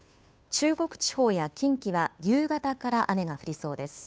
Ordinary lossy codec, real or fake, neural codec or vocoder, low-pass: none; fake; codec, 16 kHz, 2 kbps, FunCodec, trained on Chinese and English, 25 frames a second; none